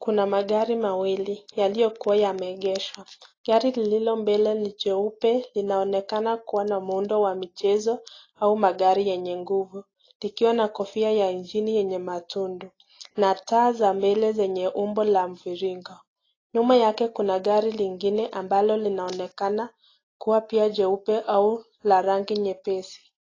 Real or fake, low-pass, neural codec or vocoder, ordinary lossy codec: real; 7.2 kHz; none; AAC, 32 kbps